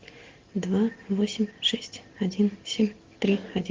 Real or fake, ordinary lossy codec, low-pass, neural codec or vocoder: real; Opus, 16 kbps; 7.2 kHz; none